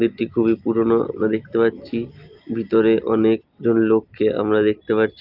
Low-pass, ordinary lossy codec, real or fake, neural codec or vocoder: 5.4 kHz; Opus, 32 kbps; real; none